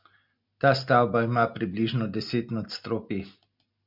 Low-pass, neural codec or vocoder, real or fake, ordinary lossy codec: 5.4 kHz; none; real; MP3, 48 kbps